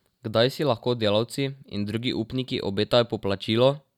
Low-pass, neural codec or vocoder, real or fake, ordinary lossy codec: 19.8 kHz; none; real; none